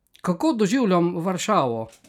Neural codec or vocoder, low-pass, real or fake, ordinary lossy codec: vocoder, 44.1 kHz, 128 mel bands every 256 samples, BigVGAN v2; 19.8 kHz; fake; none